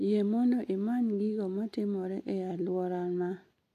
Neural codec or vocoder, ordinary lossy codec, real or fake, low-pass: none; AAC, 64 kbps; real; 14.4 kHz